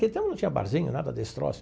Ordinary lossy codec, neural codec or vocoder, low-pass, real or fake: none; none; none; real